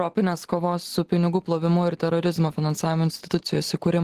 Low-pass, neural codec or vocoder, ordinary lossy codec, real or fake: 14.4 kHz; none; Opus, 16 kbps; real